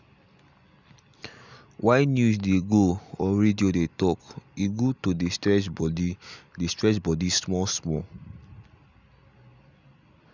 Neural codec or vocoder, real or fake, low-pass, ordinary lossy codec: none; real; 7.2 kHz; none